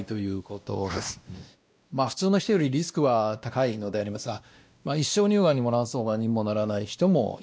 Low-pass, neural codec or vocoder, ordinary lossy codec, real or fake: none; codec, 16 kHz, 1 kbps, X-Codec, WavLM features, trained on Multilingual LibriSpeech; none; fake